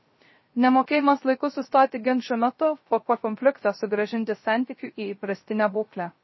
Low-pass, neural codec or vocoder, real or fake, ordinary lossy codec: 7.2 kHz; codec, 16 kHz, 0.3 kbps, FocalCodec; fake; MP3, 24 kbps